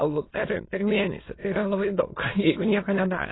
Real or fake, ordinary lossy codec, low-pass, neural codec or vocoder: fake; AAC, 16 kbps; 7.2 kHz; autoencoder, 22.05 kHz, a latent of 192 numbers a frame, VITS, trained on many speakers